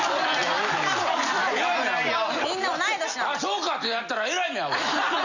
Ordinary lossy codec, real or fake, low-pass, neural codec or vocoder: none; real; 7.2 kHz; none